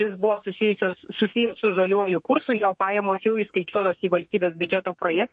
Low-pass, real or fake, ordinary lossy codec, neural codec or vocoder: 10.8 kHz; fake; MP3, 48 kbps; codec, 32 kHz, 1.9 kbps, SNAC